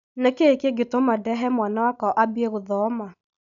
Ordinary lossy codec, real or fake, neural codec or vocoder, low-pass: none; real; none; 7.2 kHz